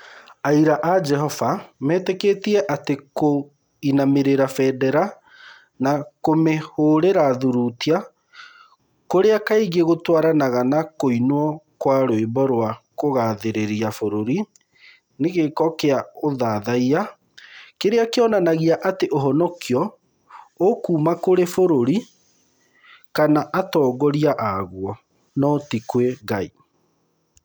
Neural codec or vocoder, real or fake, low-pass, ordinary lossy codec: none; real; none; none